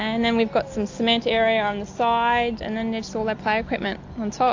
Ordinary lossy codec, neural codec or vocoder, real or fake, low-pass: AAC, 48 kbps; none; real; 7.2 kHz